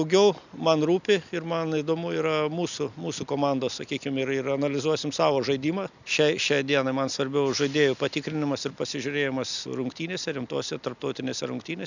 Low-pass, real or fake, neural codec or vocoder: 7.2 kHz; real; none